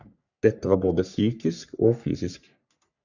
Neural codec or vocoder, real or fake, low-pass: codec, 44.1 kHz, 3.4 kbps, Pupu-Codec; fake; 7.2 kHz